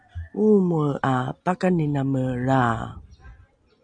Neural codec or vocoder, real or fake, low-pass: none; real; 9.9 kHz